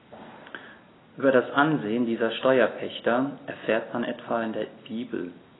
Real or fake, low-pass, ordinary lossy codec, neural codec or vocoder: real; 7.2 kHz; AAC, 16 kbps; none